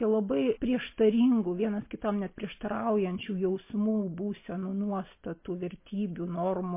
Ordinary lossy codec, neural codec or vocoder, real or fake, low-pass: MP3, 24 kbps; none; real; 3.6 kHz